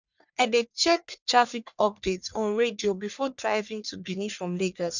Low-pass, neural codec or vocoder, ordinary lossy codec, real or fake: 7.2 kHz; codec, 44.1 kHz, 1.7 kbps, Pupu-Codec; none; fake